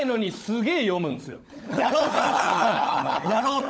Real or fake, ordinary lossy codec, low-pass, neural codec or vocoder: fake; none; none; codec, 16 kHz, 16 kbps, FunCodec, trained on LibriTTS, 50 frames a second